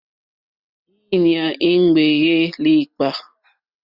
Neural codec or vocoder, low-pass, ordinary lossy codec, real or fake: none; 5.4 kHz; Opus, 64 kbps; real